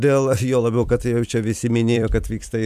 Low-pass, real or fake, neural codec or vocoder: 14.4 kHz; fake; vocoder, 44.1 kHz, 128 mel bands every 256 samples, BigVGAN v2